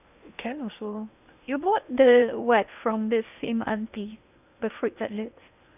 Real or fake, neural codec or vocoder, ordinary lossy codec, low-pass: fake; codec, 16 kHz in and 24 kHz out, 0.8 kbps, FocalCodec, streaming, 65536 codes; none; 3.6 kHz